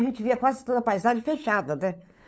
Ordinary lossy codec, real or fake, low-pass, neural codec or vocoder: none; fake; none; codec, 16 kHz, 8 kbps, FunCodec, trained on LibriTTS, 25 frames a second